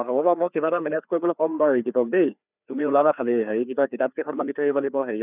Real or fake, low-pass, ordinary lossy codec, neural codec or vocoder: fake; 3.6 kHz; none; codec, 16 kHz, 2 kbps, FreqCodec, larger model